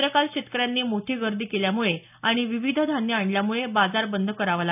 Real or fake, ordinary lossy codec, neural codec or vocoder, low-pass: real; none; none; 3.6 kHz